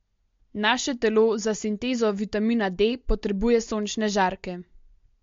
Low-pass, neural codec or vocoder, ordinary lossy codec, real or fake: 7.2 kHz; none; MP3, 48 kbps; real